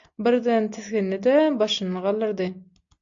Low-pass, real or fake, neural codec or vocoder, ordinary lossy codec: 7.2 kHz; real; none; AAC, 64 kbps